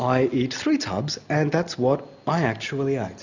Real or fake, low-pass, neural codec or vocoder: real; 7.2 kHz; none